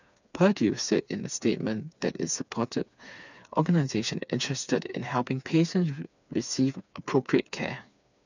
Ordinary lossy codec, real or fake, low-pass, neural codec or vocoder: none; fake; 7.2 kHz; codec, 16 kHz, 4 kbps, FreqCodec, smaller model